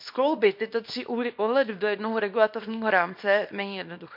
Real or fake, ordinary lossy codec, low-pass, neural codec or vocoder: fake; MP3, 48 kbps; 5.4 kHz; codec, 24 kHz, 0.9 kbps, WavTokenizer, small release